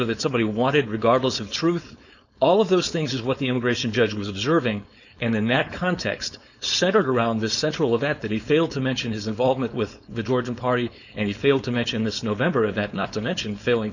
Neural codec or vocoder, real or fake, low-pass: codec, 16 kHz, 4.8 kbps, FACodec; fake; 7.2 kHz